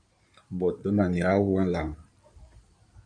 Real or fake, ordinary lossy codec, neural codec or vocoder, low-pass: fake; MP3, 96 kbps; codec, 16 kHz in and 24 kHz out, 2.2 kbps, FireRedTTS-2 codec; 9.9 kHz